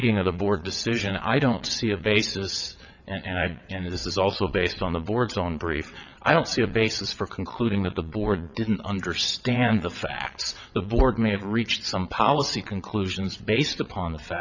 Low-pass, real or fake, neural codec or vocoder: 7.2 kHz; fake; vocoder, 22.05 kHz, 80 mel bands, WaveNeXt